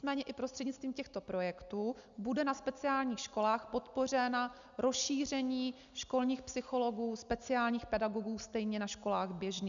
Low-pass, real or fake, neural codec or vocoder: 7.2 kHz; real; none